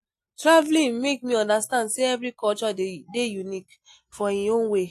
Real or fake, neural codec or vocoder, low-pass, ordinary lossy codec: real; none; 14.4 kHz; AAC, 64 kbps